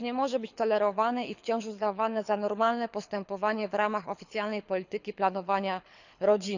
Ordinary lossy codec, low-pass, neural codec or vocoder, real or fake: none; 7.2 kHz; codec, 24 kHz, 6 kbps, HILCodec; fake